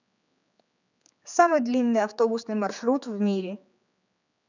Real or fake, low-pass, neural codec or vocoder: fake; 7.2 kHz; codec, 16 kHz, 4 kbps, X-Codec, HuBERT features, trained on general audio